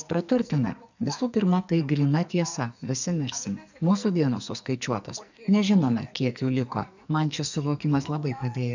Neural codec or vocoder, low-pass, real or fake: codec, 44.1 kHz, 2.6 kbps, SNAC; 7.2 kHz; fake